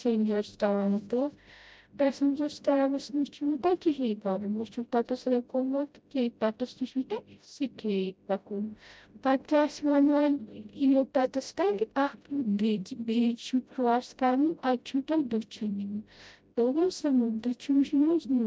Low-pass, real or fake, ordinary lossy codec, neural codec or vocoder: none; fake; none; codec, 16 kHz, 0.5 kbps, FreqCodec, smaller model